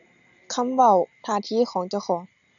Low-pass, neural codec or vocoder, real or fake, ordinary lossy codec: 7.2 kHz; none; real; none